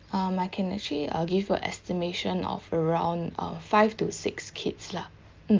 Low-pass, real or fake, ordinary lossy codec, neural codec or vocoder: 7.2 kHz; real; Opus, 32 kbps; none